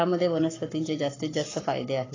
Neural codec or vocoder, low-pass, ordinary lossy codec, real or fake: codec, 44.1 kHz, 7.8 kbps, Pupu-Codec; 7.2 kHz; AAC, 32 kbps; fake